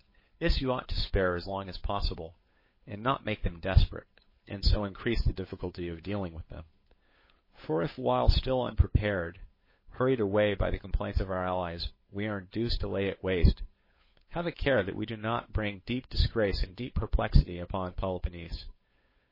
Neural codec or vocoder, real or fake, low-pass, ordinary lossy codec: codec, 16 kHz, 8 kbps, FunCodec, trained on Chinese and English, 25 frames a second; fake; 5.4 kHz; MP3, 24 kbps